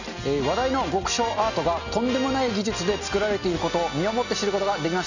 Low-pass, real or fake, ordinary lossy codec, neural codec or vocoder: 7.2 kHz; real; none; none